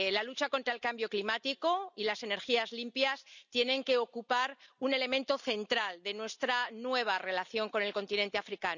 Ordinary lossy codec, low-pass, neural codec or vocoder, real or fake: none; 7.2 kHz; none; real